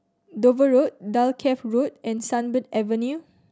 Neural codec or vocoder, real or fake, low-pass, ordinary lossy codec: none; real; none; none